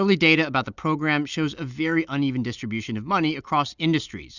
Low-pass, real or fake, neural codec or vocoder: 7.2 kHz; real; none